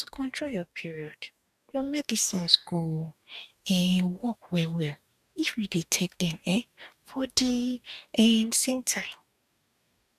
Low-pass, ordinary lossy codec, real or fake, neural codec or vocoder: 14.4 kHz; none; fake; codec, 44.1 kHz, 2.6 kbps, DAC